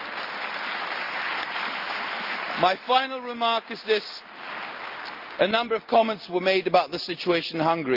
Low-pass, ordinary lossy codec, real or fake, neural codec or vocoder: 5.4 kHz; Opus, 32 kbps; real; none